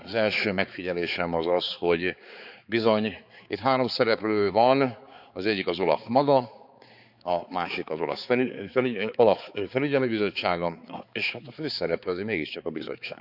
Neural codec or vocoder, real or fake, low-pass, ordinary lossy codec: codec, 16 kHz, 4 kbps, X-Codec, HuBERT features, trained on balanced general audio; fake; 5.4 kHz; none